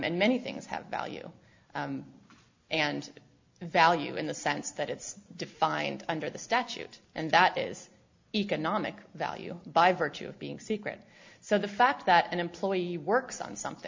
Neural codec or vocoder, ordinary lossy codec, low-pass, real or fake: none; MP3, 64 kbps; 7.2 kHz; real